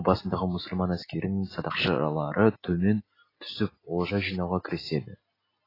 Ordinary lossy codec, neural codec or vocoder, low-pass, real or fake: AAC, 24 kbps; none; 5.4 kHz; real